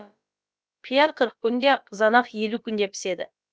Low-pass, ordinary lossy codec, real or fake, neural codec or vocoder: none; none; fake; codec, 16 kHz, about 1 kbps, DyCAST, with the encoder's durations